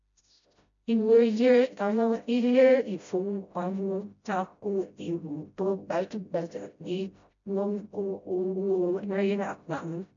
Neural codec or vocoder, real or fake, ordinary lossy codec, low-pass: codec, 16 kHz, 0.5 kbps, FreqCodec, smaller model; fake; MP3, 64 kbps; 7.2 kHz